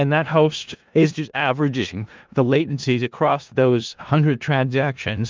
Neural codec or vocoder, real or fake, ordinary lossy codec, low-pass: codec, 16 kHz in and 24 kHz out, 0.4 kbps, LongCat-Audio-Codec, four codebook decoder; fake; Opus, 32 kbps; 7.2 kHz